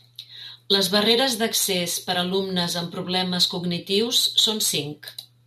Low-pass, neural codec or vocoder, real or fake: 14.4 kHz; none; real